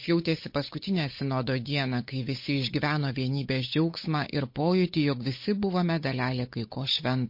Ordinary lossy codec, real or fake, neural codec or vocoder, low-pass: MP3, 32 kbps; fake; codec, 16 kHz, 8 kbps, FunCodec, trained on Chinese and English, 25 frames a second; 5.4 kHz